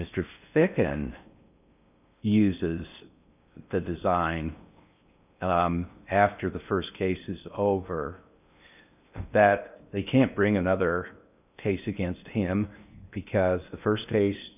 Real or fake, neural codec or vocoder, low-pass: fake; codec, 16 kHz in and 24 kHz out, 0.6 kbps, FocalCodec, streaming, 4096 codes; 3.6 kHz